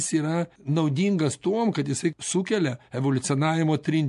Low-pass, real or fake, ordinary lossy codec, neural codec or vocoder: 10.8 kHz; real; MP3, 64 kbps; none